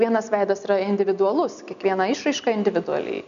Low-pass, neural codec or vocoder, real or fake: 7.2 kHz; none; real